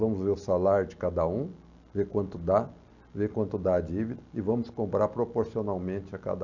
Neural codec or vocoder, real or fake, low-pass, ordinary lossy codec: none; real; 7.2 kHz; none